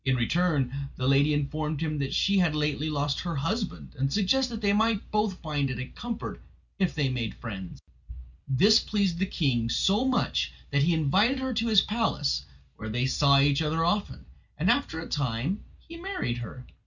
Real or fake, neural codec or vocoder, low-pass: real; none; 7.2 kHz